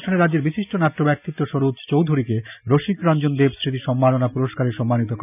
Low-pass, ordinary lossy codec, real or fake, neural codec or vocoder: 3.6 kHz; none; real; none